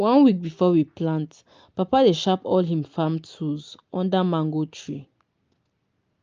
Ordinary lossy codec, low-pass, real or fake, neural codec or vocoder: Opus, 24 kbps; 7.2 kHz; real; none